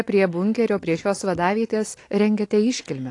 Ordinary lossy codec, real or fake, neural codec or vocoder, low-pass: AAC, 48 kbps; real; none; 10.8 kHz